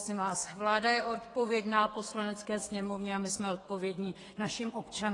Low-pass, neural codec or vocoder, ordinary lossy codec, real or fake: 10.8 kHz; codec, 32 kHz, 1.9 kbps, SNAC; AAC, 32 kbps; fake